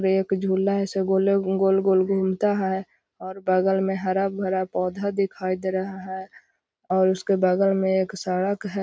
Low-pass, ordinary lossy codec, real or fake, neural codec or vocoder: none; none; real; none